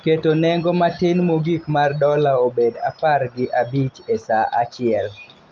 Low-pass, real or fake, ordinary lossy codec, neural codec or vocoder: 7.2 kHz; real; Opus, 24 kbps; none